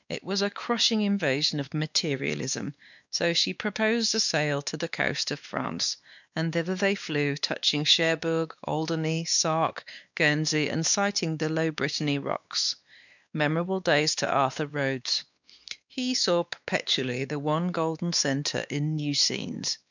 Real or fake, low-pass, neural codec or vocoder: fake; 7.2 kHz; codec, 16 kHz, 2 kbps, X-Codec, WavLM features, trained on Multilingual LibriSpeech